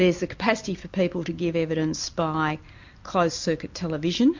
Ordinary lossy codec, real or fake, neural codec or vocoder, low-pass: MP3, 48 kbps; real; none; 7.2 kHz